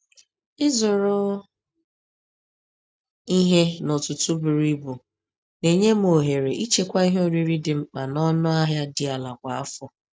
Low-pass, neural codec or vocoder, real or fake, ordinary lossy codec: none; none; real; none